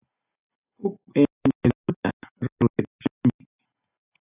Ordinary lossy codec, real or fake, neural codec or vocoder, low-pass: AAC, 32 kbps; real; none; 3.6 kHz